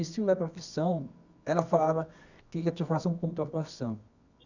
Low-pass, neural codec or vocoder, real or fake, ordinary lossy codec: 7.2 kHz; codec, 24 kHz, 0.9 kbps, WavTokenizer, medium music audio release; fake; none